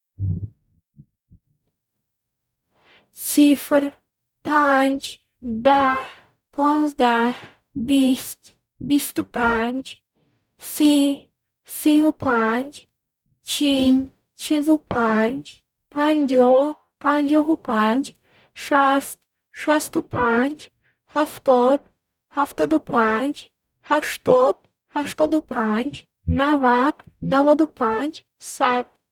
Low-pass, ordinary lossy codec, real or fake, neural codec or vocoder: 19.8 kHz; none; fake; codec, 44.1 kHz, 0.9 kbps, DAC